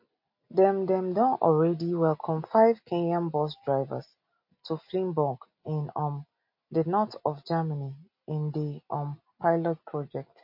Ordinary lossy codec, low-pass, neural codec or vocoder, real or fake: MP3, 32 kbps; 5.4 kHz; none; real